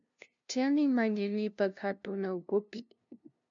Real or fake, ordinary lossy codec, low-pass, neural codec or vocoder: fake; MP3, 64 kbps; 7.2 kHz; codec, 16 kHz, 0.5 kbps, FunCodec, trained on LibriTTS, 25 frames a second